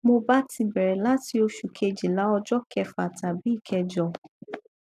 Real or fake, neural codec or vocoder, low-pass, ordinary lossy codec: real; none; 14.4 kHz; none